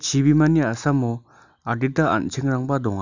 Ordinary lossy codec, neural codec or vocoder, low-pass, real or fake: none; none; 7.2 kHz; real